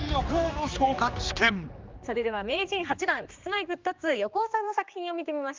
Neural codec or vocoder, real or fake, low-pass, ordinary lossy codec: codec, 16 kHz, 2 kbps, X-Codec, HuBERT features, trained on general audio; fake; 7.2 kHz; Opus, 24 kbps